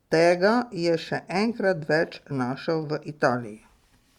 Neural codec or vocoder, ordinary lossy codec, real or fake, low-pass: none; Opus, 64 kbps; real; 19.8 kHz